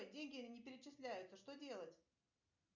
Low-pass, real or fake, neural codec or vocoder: 7.2 kHz; real; none